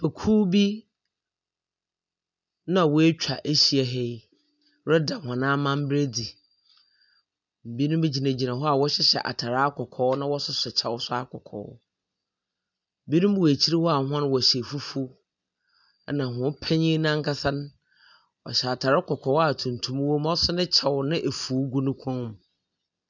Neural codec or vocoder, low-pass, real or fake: none; 7.2 kHz; real